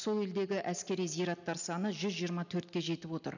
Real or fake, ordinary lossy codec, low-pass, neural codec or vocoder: real; none; 7.2 kHz; none